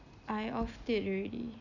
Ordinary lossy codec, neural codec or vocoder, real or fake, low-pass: none; none; real; 7.2 kHz